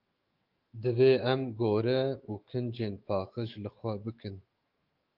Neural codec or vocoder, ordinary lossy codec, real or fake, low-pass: autoencoder, 48 kHz, 128 numbers a frame, DAC-VAE, trained on Japanese speech; Opus, 32 kbps; fake; 5.4 kHz